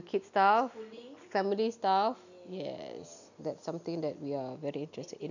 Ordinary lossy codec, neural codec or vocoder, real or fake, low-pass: none; none; real; 7.2 kHz